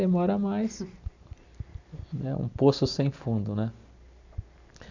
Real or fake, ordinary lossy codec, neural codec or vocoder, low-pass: real; none; none; 7.2 kHz